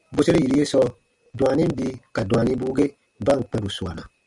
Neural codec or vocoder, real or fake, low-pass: none; real; 10.8 kHz